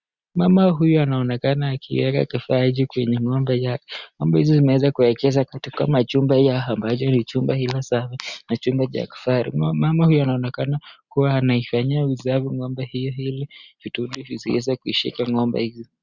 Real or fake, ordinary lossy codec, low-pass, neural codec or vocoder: real; Opus, 64 kbps; 7.2 kHz; none